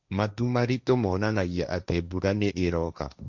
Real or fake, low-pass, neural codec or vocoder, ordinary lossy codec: fake; 7.2 kHz; codec, 16 kHz, 1.1 kbps, Voila-Tokenizer; Opus, 64 kbps